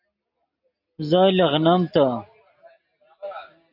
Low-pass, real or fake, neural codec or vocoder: 5.4 kHz; real; none